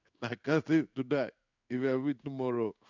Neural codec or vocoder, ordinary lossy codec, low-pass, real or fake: codec, 16 kHz in and 24 kHz out, 1 kbps, XY-Tokenizer; none; 7.2 kHz; fake